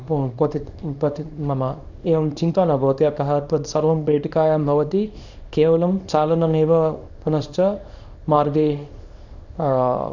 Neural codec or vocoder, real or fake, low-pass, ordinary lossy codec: codec, 24 kHz, 0.9 kbps, WavTokenizer, small release; fake; 7.2 kHz; none